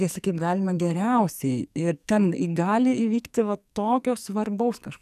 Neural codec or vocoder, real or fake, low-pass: codec, 44.1 kHz, 2.6 kbps, SNAC; fake; 14.4 kHz